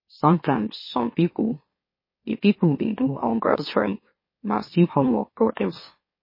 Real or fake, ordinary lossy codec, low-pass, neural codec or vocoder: fake; MP3, 24 kbps; 5.4 kHz; autoencoder, 44.1 kHz, a latent of 192 numbers a frame, MeloTTS